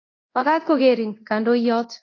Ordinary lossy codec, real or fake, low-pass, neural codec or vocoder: AAC, 32 kbps; fake; 7.2 kHz; codec, 24 kHz, 0.9 kbps, DualCodec